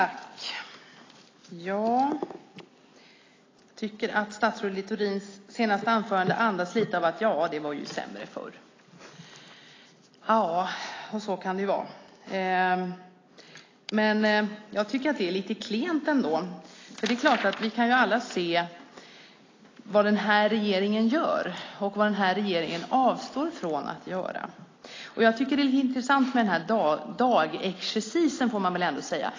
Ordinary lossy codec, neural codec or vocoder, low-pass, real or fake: AAC, 32 kbps; none; 7.2 kHz; real